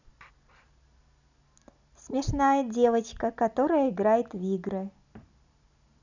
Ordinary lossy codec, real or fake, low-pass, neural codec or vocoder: none; real; 7.2 kHz; none